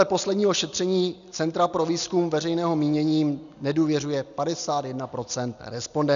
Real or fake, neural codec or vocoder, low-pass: real; none; 7.2 kHz